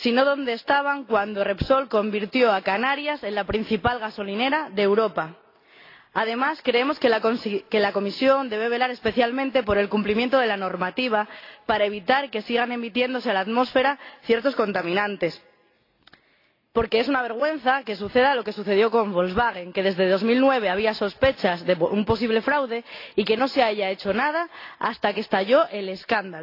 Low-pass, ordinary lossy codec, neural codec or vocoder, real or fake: 5.4 kHz; AAC, 32 kbps; none; real